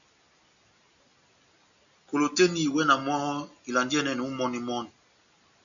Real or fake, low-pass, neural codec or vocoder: real; 7.2 kHz; none